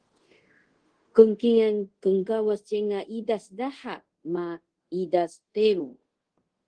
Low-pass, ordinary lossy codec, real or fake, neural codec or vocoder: 9.9 kHz; Opus, 16 kbps; fake; codec, 24 kHz, 0.5 kbps, DualCodec